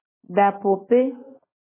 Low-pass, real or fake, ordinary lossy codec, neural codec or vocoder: 3.6 kHz; real; MP3, 16 kbps; none